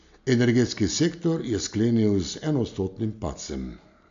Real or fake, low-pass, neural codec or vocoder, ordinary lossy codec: real; 7.2 kHz; none; AAC, 64 kbps